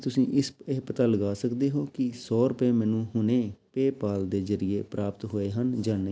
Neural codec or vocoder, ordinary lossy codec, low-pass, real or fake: none; none; none; real